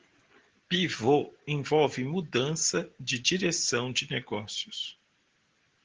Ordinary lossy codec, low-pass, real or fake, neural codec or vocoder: Opus, 16 kbps; 7.2 kHz; real; none